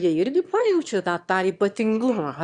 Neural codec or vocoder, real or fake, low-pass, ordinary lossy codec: autoencoder, 22.05 kHz, a latent of 192 numbers a frame, VITS, trained on one speaker; fake; 9.9 kHz; Opus, 64 kbps